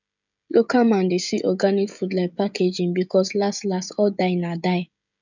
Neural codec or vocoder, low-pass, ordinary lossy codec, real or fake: codec, 16 kHz, 16 kbps, FreqCodec, smaller model; 7.2 kHz; none; fake